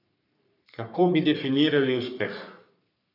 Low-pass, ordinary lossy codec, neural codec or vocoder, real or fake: 5.4 kHz; none; codec, 44.1 kHz, 3.4 kbps, Pupu-Codec; fake